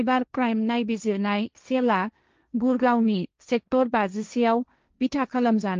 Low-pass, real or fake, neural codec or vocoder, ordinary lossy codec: 7.2 kHz; fake; codec, 16 kHz, 1.1 kbps, Voila-Tokenizer; Opus, 24 kbps